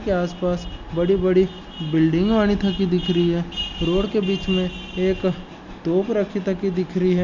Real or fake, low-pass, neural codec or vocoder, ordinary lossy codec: real; 7.2 kHz; none; none